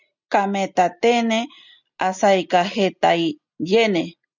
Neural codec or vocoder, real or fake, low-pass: none; real; 7.2 kHz